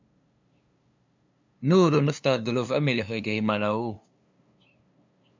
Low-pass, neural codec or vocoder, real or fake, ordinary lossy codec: 7.2 kHz; codec, 16 kHz, 2 kbps, FunCodec, trained on LibriTTS, 25 frames a second; fake; MP3, 64 kbps